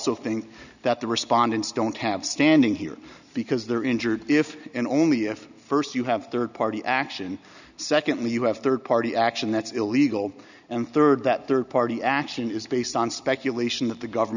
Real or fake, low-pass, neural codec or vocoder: real; 7.2 kHz; none